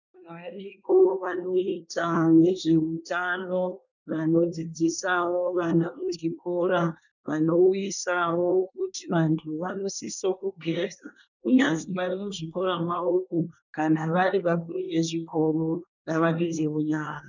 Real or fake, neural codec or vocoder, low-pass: fake; codec, 24 kHz, 1 kbps, SNAC; 7.2 kHz